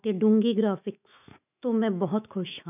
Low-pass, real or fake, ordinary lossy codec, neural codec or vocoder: 3.6 kHz; fake; none; autoencoder, 48 kHz, 128 numbers a frame, DAC-VAE, trained on Japanese speech